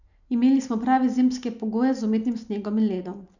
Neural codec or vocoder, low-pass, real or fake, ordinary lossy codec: none; 7.2 kHz; real; none